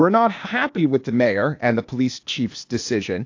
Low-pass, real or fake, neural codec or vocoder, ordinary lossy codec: 7.2 kHz; fake; codec, 16 kHz, 0.8 kbps, ZipCodec; AAC, 48 kbps